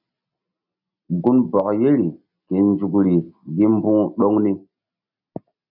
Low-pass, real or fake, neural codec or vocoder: 5.4 kHz; real; none